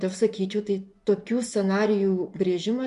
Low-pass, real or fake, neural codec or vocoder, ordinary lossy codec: 10.8 kHz; fake; vocoder, 24 kHz, 100 mel bands, Vocos; MP3, 64 kbps